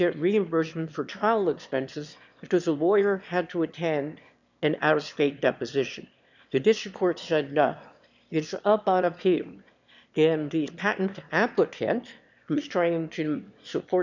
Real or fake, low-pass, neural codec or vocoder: fake; 7.2 kHz; autoencoder, 22.05 kHz, a latent of 192 numbers a frame, VITS, trained on one speaker